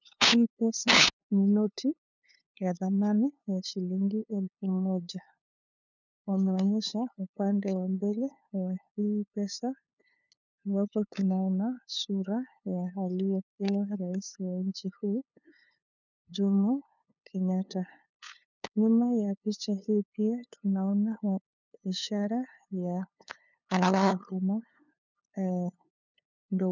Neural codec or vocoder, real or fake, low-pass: codec, 16 kHz, 2 kbps, FunCodec, trained on LibriTTS, 25 frames a second; fake; 7.2 kHz